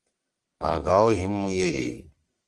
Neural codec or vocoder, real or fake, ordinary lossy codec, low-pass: codec, 44.1 kHz, 1.7 kbps, Pupu-Codec; fake; Opus, 32 kbps; 10.8 kHz